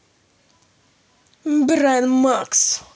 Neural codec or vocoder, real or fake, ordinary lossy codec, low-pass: none; real; none; none